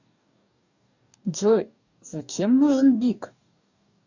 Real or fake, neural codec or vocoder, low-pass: fake; codec, 44.1 kHz, 2.6 kbps, DAC; 7.2 kHz